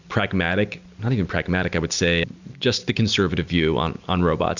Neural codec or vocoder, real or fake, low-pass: none; real; 7.2 kHz